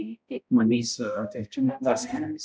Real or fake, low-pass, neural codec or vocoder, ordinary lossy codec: fake; none; codec, 16 kHz, 0.5 kbps, X-Codec, HuBERT features, trained on balanced general audio; none